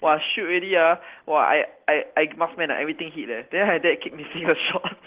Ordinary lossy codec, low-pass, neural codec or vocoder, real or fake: Opus, 16 kbps; 3.6 kHz; none; real